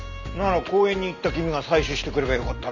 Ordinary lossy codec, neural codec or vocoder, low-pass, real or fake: none; none; 7.2 kHz; real